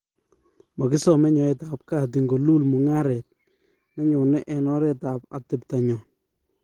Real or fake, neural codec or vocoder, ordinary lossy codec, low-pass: real; none; Opus, 16 kbps; 19.8 kHz